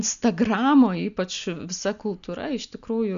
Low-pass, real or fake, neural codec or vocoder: 7.2 kHz; real; none